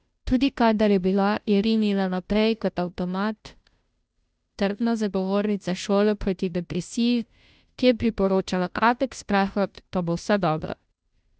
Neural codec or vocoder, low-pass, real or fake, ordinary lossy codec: codec, 16 kHz, 0.5 kbps, FunCodec, trained on Chinese and English, 25 frames a second; none; fake; none